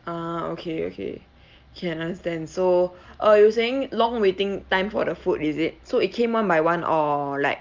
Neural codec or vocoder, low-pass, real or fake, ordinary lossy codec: none; 7.2 kHz; real; Opus, 24 kbps